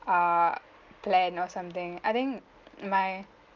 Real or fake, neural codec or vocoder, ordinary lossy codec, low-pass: real; none; Opus, 32 kbps; 7.2 kHz